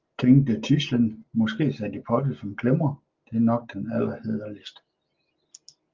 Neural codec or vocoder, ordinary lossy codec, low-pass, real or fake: none; Opus, 32 kbps; 7.2 kHz; real